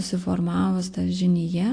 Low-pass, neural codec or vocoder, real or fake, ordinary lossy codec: 9.9 kHz; none; real; AAC, 48 kbps